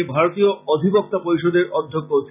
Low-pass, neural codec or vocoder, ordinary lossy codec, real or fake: 3.6 kHz; none; none; real